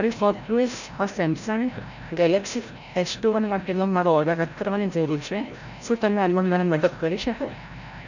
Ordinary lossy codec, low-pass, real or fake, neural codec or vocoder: none; 7.2 kHz; fake; codec, 16 kHz, 0.5 kbps, FreqCodec, larger model